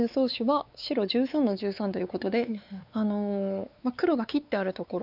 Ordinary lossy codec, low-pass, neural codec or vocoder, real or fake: none; 5.4 kHz; codec, 16 kHz, 4 kbps, X-Codec, WavLM features, trained on Multilingual LibriSpeech; fake